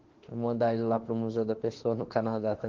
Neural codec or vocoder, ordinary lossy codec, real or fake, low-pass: autoencoder, 48 kHz, 32 numbers a frame, DAC-VAE, trained on Japanese speech; Opus, 16 kbps; fake; 7.2 kHz